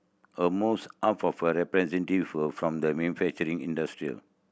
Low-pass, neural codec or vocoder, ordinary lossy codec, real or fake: none; none; none; real